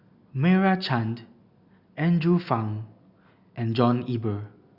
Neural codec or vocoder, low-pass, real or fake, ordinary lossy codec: none; 5.4 kHz; real; Opus, 64 kbps